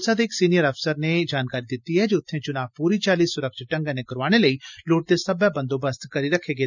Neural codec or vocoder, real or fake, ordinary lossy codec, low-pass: none; real; none; 7.2 kHz